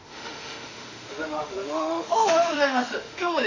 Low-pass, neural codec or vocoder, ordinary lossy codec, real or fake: 7.2 kHz; autoencoder, 48 kHz, 32 numbers a frame, DAC-VAE, trained on Japanese speech; none; fake